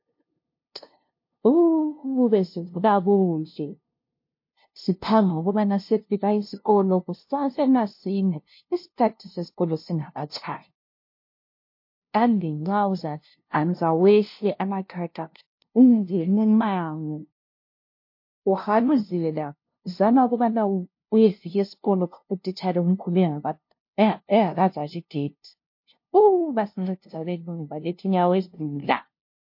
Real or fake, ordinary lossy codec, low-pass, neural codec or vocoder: fake; MP3, 32 kbps; 5.4 kHz; codec, 16 kHz, 0.5 kbps, FunCodec, trained on LibriTTS, 25 frames a second